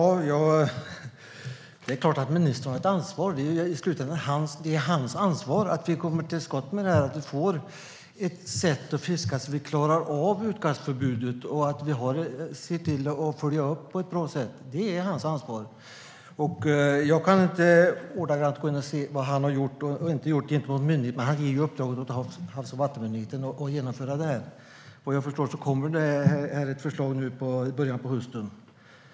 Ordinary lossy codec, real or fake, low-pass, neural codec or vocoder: none; real; none; none